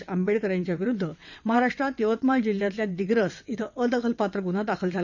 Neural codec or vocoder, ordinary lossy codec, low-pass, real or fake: vocoder, 22.05 kHz, 80 mel bands, WaveNeXt; none; 7.2 kHz; fake